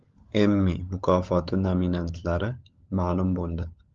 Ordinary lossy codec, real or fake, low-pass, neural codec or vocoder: Opus, 32 kbps; fake; 7.2 kHz; codec, 16 kHz, 16 kbps, FunCodec, trained on LibriTTS, 50 frames a second